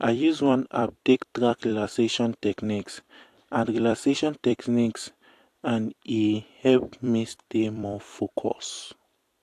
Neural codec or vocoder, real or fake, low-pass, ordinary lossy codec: vocoder, 44.1 kHz, 128 mel bands every 256 samples, BigVGAN v2; fake; 14.4 kHz; AAC, 64 kbps